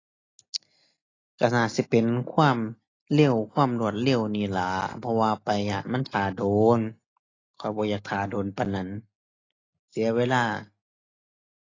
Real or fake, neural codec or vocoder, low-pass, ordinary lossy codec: real; none; 7.2 kHz; AAC, 32 kbps